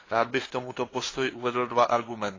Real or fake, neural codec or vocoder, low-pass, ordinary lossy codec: fake; codec, 16 kHz, 2 kbps, FunCodec, trained on LibriTTS, 25 frames a second; 7.2 kHz; AAC, 32 kbps